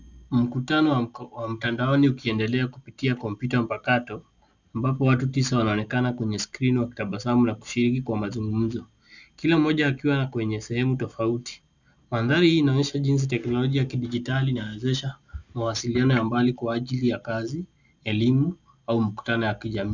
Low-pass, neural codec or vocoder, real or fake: 7.2 kHz; none; real